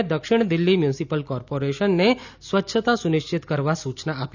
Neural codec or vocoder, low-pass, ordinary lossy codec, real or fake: none; 7.2 kHz; none; real